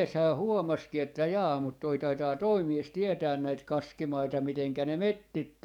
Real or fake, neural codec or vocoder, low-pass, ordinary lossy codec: fake; codec, 44.1 kHz, 7.8 kbps, DAC; 19.8 kHz; none